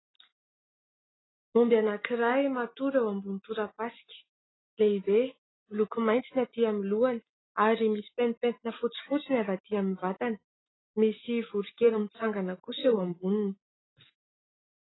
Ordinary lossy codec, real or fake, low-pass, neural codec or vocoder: AAC, 16 kbps; real; 7.2 kHz; none